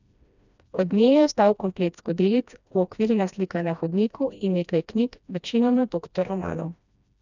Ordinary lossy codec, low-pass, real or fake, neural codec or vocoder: none; 7.2 kHz; fake; codec, 16 kHz, 1 kbps, FreqCodec, smaller model